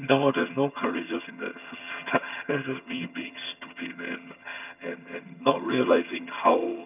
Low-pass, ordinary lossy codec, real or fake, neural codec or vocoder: 3.6 kHz; none; fake; vocoder, 22.05 kHz, 80 mel bands, HiFi-GAN